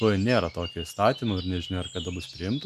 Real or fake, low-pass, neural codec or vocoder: real; 14.4 kHz; none